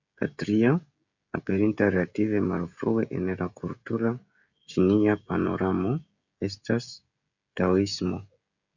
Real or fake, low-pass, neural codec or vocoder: fake; 7.2 kHz; codec, 16 kHz, 16 kbps, FreqCodec, smaller model